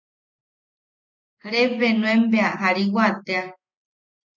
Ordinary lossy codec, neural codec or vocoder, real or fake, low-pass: MP3, 48 kbps; none; real; 7.2 kHz